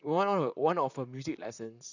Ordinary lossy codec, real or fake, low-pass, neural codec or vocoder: none; fake; 7.2 kHz; vocoder, 44.1 kHz, 128 mel bands, Pupu-Vocoder